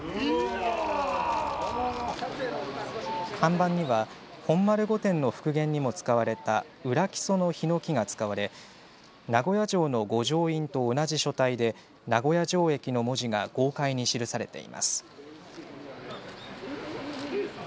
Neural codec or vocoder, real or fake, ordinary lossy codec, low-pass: none; real; none; none